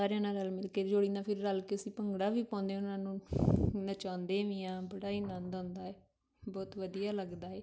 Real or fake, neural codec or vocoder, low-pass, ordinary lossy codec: real; none; none; none